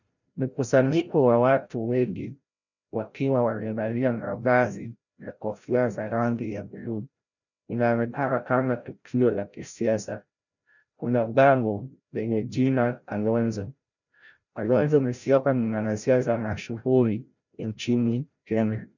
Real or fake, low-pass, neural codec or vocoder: fake; 7.2 kHz; codec, 16 kHz, 0.5 kbps, FreqCodec, larger model